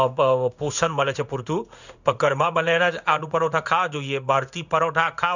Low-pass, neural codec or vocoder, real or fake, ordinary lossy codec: 7.2 kHz; codec, 16 kHz in and 24 kHz out, 1 kbps, XY-Tokenizer; fake; none